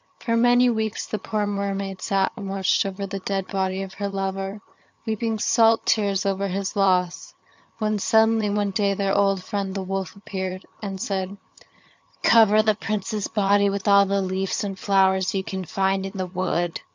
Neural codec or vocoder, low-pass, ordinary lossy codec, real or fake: vocoder, 22.05 kHz, 80 mel bands, HiFi-GAN; 7.2 kHz; MP3, 64 kbps; fake